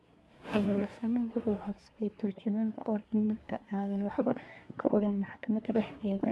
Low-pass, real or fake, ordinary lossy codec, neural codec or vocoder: none; fake; none; codec, 24 kHz, 1 kbps, SNAC